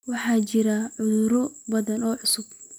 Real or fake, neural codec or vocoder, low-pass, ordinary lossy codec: real; none; none; none